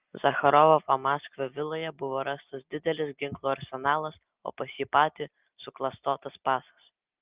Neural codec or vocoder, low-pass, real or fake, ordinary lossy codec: none; 3.6 kHz; real; Opus, 32 kbps